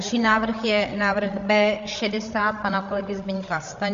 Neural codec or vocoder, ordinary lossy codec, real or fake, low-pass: codec, 16 kHz, 8 kbps, FreqCodec, larger model; MP3, 48 kbps; fake; 7.2 kHz